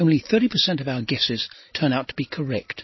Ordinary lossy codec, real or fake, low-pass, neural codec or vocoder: MP3, 24 kbps; real; 7.2 kHz; none